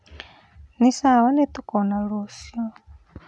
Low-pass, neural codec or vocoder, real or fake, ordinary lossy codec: none; none; real; none